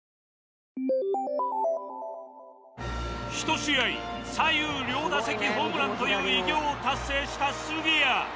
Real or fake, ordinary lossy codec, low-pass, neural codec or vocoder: real; none; none; none